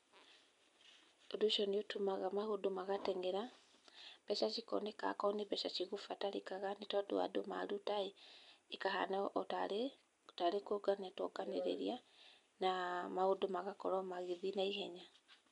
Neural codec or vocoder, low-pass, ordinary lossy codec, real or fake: none; 10.8 kHz; none; real